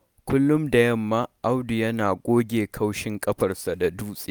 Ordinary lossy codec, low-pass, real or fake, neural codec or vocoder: none; none; real; none